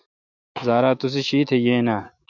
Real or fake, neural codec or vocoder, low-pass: fake; autoencoder, 48 kHz, 128 numbers a frame, DAC-VAE, trained on Japanese speech; 7.2 kHz